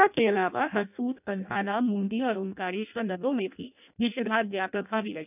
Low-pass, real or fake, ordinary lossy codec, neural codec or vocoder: 3.6 kHz; fake; none; codec, 16 kHz in and 24 kHz out, 0.6 kbps, FireRedTTS-2 codec